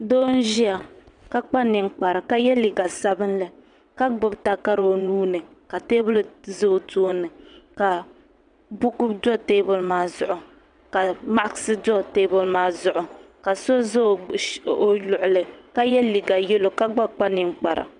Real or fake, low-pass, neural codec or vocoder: fake; 9.9 kHz; vocoder, 22.05 kHz, 80 mel bands, WaveNeXt